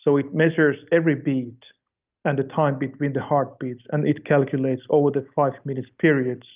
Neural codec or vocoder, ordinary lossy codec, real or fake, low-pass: none; Opus, 24 kbps; real; 3.6 kHz